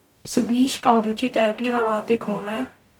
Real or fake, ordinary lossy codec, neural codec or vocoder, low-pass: fake; none; codec, 44.1 kHz, 0.9 kbps, DAC; 19.8 kHz